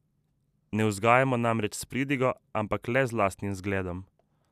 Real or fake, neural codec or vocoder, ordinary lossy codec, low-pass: real; none; none; 14.4 kHz